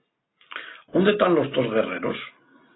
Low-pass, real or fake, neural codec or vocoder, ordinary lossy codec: 7.2 kHz; real; none; AAC, 16 kbps